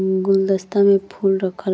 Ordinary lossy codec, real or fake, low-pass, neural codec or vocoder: none; real; none; none